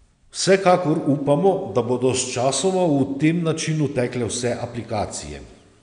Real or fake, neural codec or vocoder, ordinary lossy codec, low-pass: real; none; none; 9.9 kHz